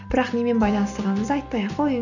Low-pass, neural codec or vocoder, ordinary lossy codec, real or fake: 7.2 kHz; none; none; real